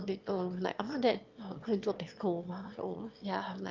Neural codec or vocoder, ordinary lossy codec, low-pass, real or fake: autoencoder, 22.05 kHz, a latent of 192 numbers a frame, VITS, trained on one speaker; Opus, 24 kbps; 7.2 kHz; fake